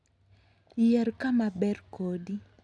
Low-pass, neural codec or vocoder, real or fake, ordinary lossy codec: none; none; real; none